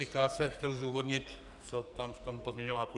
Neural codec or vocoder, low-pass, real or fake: codec, 44.1 kHz, 2.6 kbps, SNAC; 10.8 kHz; fake